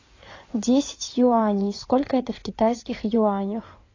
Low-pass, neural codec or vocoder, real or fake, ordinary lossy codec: 7.2 kHz; codec, 16 kHz in and 24 kHz out, 2.2 kbps, FireRedTTS-2 codec; fake; AAC, 32 kbps